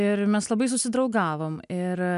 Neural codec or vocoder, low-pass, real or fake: none; 10.8 kHz; real